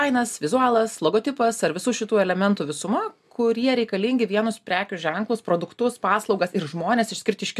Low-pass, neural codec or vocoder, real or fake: 14.4 kHz; none; real